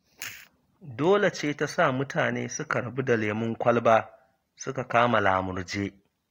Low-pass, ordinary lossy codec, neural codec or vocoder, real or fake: 19.8 kHz; AAC, 48 kbps; none; real